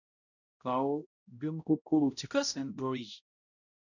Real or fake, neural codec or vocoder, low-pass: fake; codec, 16 kHz, 0.5 kbps, X-Codec, HuBERT features, trained on balanced general audio; 7.2 kHz